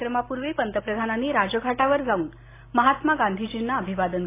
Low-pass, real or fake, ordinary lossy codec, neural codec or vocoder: 3.6 kHz; real; AAC, 24 kbps; none